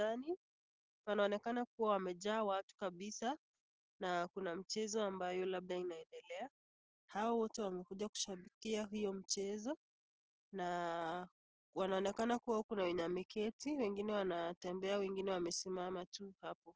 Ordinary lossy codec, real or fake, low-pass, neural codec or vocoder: Opus, 16 kbps; real; 7.2 kHz; none